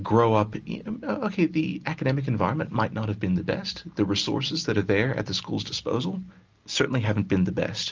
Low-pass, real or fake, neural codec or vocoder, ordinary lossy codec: 7.2 kHz; real; none; Opus, 24 kbps